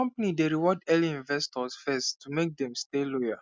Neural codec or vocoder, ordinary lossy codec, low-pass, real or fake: none; none; none; real